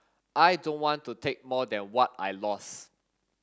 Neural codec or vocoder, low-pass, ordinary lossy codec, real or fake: none; none; none; real